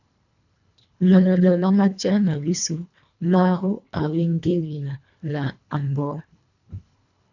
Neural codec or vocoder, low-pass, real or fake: codec, 24 kHz, 1.5 kbps, HILCodec; 7.2 kHz; fake